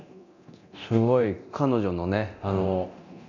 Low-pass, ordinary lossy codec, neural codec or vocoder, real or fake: 7.2 kHz; none; codec, 24 kHz, 0.9 kbps, DualCodec; fake